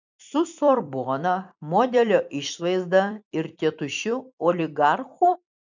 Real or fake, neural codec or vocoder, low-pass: fake; vocoder, 44.1 kHz, 128 mel bands every 256 samples, BigVGAN v2; 7.2 kHz